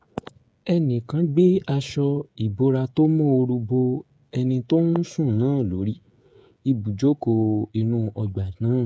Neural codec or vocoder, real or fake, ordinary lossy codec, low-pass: codec, 16 kHz, 16 kbps, FreqCodec, smaller model; fake; none; none